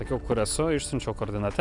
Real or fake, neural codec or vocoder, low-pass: real; none; 10.8 kHz